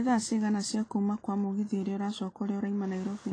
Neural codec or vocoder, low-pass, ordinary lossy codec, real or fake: none; 9.9 kHz; AAC, 32 kbps; real